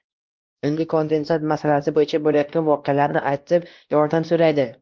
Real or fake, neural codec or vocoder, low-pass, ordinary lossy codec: fake; codec, 16 kHz, 1 kbps, X-Codec, WavLM features, trained on Multilingual LibriSpeech; 7.2 kHz; Opus, 32 kbps